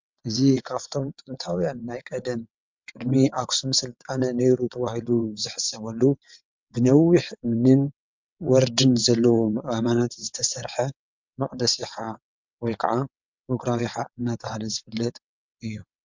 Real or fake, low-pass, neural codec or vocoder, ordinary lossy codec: fake; 7.2 kHz; vocoder, 22.05 kHz, 80 mel bands, WaveNeXt; MP3, 64 kbps